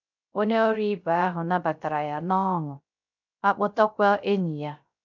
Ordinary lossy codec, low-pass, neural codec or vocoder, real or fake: none; 7.2 kHz; codec, 16 kHz, 0.3 kbps, FocalCodec; fake